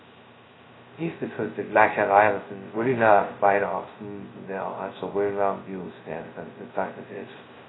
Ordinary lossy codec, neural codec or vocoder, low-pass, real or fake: AAC, 16 kbps; codec, 16 kHz, 0.2 kbps, FocalCodec; 7.2 kHz; fake